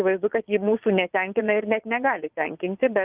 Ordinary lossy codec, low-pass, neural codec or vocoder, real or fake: Opus, 32 kbps; 3.6 kHz; vocoder, 22.05 kHz, 80 mel bands, Vocos; fake